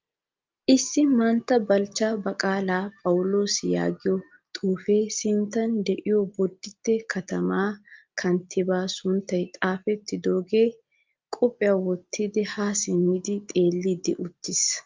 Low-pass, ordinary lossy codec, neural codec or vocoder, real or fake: 7.2 kHz; Opus, 24 kbps; none; real